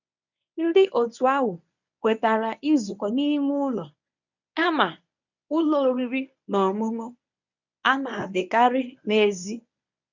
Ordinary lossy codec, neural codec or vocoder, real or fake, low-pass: none; codec, 24 kHz, 0.9 kbps, WavTokenizer, medium speech release version 1; fake; 7.2 kHz